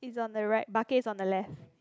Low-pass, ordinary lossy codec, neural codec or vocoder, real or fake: none; none; none; real